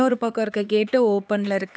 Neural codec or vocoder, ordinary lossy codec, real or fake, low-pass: codec, 16 kHz, 4 kbps, X-Codec, HuBERT features, trained on LibriSpeech; none; fake; none